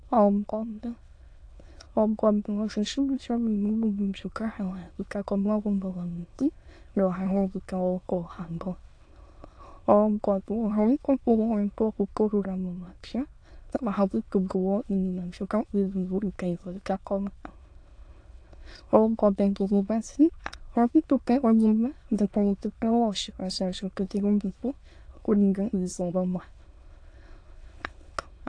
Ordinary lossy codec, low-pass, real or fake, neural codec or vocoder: AAC, 48 kbps; 9.9 kHz; fake; autoencoder, 22.05 kHz, a latent of 192 numbers a frame, VITS, trained on many speakers